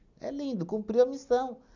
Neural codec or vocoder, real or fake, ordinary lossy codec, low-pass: none; real; none; 7.2 kHz